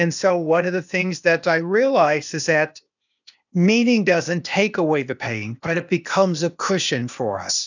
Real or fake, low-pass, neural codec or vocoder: fake; 7.2 kHz; codec, 16 kHz, 0.8 kbps, ZipCodec